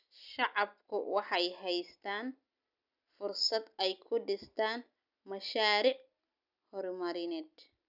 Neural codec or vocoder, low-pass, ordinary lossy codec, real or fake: none; 5.4 kHz; none; real